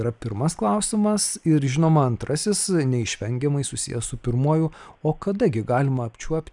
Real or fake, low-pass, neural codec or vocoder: real; 10.8 kHz; none